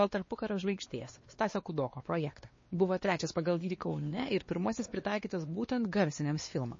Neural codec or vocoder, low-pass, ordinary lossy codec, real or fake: codec, 16 kHz, 2 kbps, X-Codec, WavLM features, trained on Multilingual LibriSpeech; 7.2 kHz; MP3, 32 kbps; fake